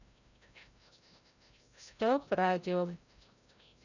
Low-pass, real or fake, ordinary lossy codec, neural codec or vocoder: 7.2 kHz; fake; none; codec, 16 kHz, 0.5 kbps, FreqCodec, larger model